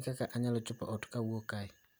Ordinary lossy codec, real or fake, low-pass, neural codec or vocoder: none; real; none; none